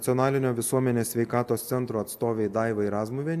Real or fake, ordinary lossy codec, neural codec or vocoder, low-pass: real; AAC, 96 kbps; none; 14.4 kHz